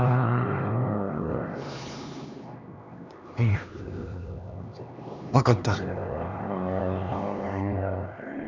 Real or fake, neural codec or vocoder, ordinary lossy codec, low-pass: fake; codec, 16 kHz, 2 kbps, X-Codec, HuBERT features, trained on LibriSpeech; none; 7.2 kHz